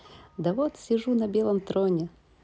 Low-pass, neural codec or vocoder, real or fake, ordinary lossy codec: none; none; real; none